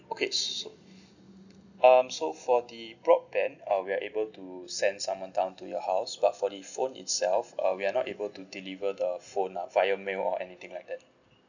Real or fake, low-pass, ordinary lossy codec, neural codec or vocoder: real; 7.2 kHz; none; none